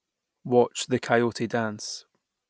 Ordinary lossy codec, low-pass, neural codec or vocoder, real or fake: none; none; none; real